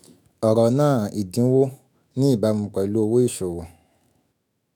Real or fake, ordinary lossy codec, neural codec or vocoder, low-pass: fake; none; autoencoder, 48 kHz, 128 numbers a frame, DAC-VAE, trained on Japanese speech; none